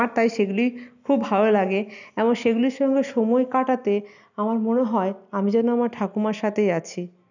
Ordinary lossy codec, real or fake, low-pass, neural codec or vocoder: none; real; 7.2 kHz; none